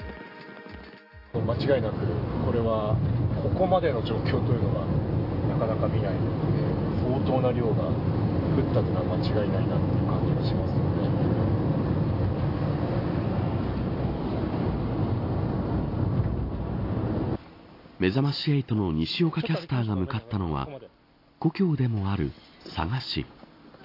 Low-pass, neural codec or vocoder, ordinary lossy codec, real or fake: 5.4 kHz; none; AAC, 32 kbps; real